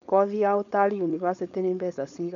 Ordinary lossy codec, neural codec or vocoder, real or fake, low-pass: MP3, 64 kbps; codec, 16 kHz, 4.8 kbps, FACodec; fake; 7.2 kHz